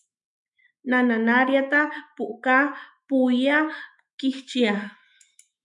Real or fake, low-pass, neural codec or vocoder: fake; 10.8 kHz; autoencoder, 48 kHz, 128 numbers a frame, DAC-VAE, trained on Japanese speech